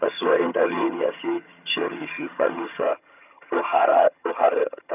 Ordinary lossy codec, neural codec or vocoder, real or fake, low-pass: none; vocoder, 22.05 kHz, 80 mel bands, HiFi-GAN; fake; 3.6 kHz